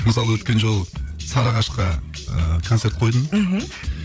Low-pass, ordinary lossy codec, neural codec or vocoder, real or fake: none; none; codec, 16 kHz, 8 kbps, FreqCodec, larger model; fake